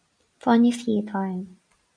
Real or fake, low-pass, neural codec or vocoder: real; 9.9 kHz; none